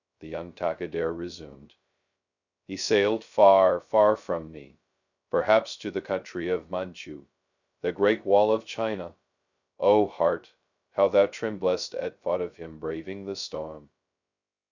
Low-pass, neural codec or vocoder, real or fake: 7.2 kHz; codec, 16 kHz, 0.2 kbps, FocalCodec; fake